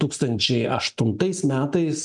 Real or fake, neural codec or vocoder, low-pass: real; none; 10.8 kHz